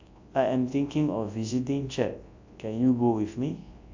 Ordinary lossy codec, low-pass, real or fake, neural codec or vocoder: AAC, 48 kbps; 7.2 kHz; fake; codec, 24 kHz, 0.9 kbps, WavTokenizer, large speech release